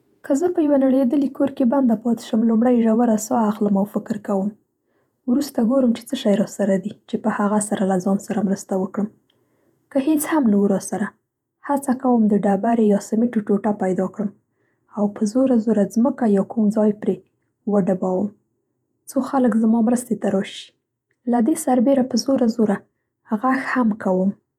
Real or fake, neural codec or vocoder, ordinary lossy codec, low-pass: fake; vocoder, 48 kHz, 128 mel bands, Vocos; none; 19.8 kHz